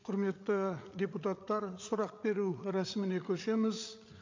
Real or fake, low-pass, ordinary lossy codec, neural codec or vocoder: fake; 7.2 kHz; MP3, 48 kbps; codec, 16 kHz, 8 kbps, FreqCodec, larger model